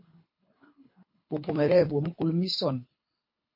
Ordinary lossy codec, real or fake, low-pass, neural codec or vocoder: MP3, 32 kbps; fake; 5.4 kHz; codec, 24 kHz, 3 kbps, HILCodec